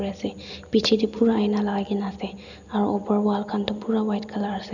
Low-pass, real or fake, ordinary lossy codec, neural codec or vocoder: 7.2 kHz; real; none; none